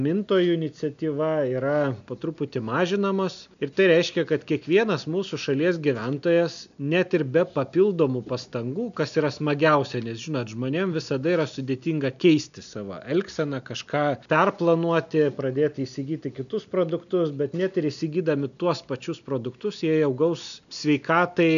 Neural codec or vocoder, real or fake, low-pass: none; real; 7.2 kHz